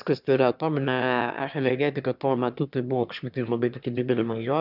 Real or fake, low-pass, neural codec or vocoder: fake; 5.4 kHz; autoencoder, 22.05 kHz, a latent of 192 numbers a frame, VITS, trained on one speaker